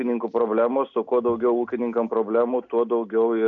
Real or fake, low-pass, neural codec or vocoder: real; 7.2 kHz; none